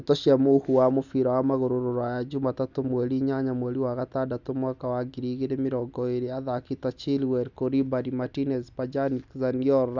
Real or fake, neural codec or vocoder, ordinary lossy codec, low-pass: real; none; none; 7.2 kHz